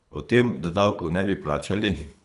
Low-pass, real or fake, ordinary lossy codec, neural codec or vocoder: 10.8 kHz; fake; none; codec, 24 kHz, 3 kbps, HILCodec